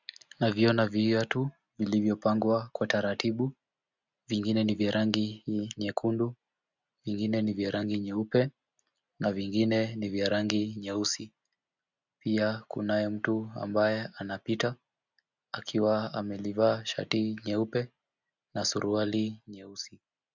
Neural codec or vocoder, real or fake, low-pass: none; real; 7.2 kHz